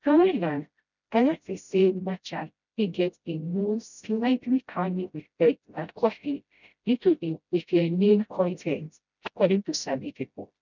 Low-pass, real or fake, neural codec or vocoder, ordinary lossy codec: 7.2 kHz; fake; codec, 16 kHz, 0.5 kbps, FreqCodec, smaller model; none